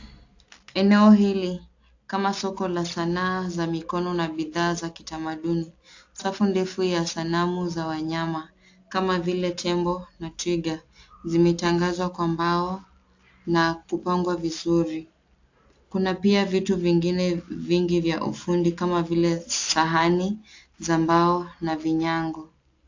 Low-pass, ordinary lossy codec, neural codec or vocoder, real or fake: 7.2 kHz; AAC, 48 kbps; none; real